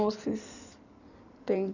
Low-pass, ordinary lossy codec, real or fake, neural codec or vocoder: 7.2 kHz; none; fake; codec, 16 kHz in and 24 kHz out, 2.2 kbps, FireRedTTS-2 codec